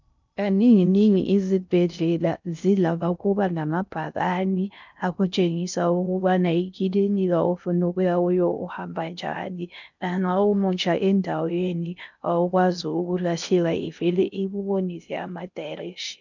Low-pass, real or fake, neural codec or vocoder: 7.2 kHz; fake; codec, 16 kHz in and 24 kHz out, 0.6 kbps, FocalCodec, streaming, 2048 codes